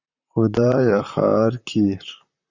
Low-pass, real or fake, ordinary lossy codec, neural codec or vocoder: 7.2 kHz; fake; Opus, 64 kbps; vocoder, 44.1 kHz, 128 mel bands, Pupu-Vocoder